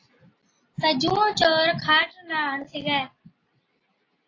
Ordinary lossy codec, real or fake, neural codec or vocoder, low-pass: AAC, 32 kbps; real; none; 7.2 kHz